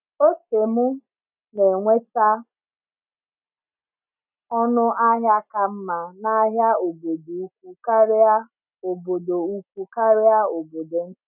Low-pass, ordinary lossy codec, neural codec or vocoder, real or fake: 3.6 kHz; none; none; real